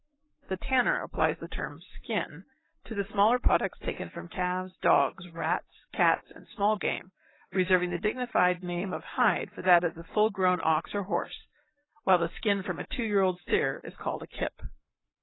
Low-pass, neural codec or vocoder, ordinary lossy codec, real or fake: 7.2 kHz; codec, 44.1 kHz, 7.8 kbps, Pupu-Codec; AAC, 16 kbps; fake